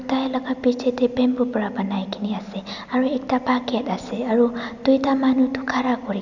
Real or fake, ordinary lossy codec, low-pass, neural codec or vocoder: real; none; 7.2 kHz; none